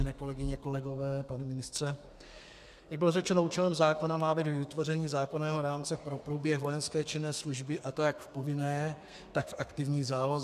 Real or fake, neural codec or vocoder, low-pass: fake; codec, 32 kHz, 1.9 kbps, SNAC; 14.4 kHz